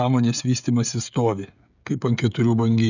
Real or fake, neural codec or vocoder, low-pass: fake; codec, 16 kHz, 16 kbps, FreqCodec, smaller model; 7.2 kHz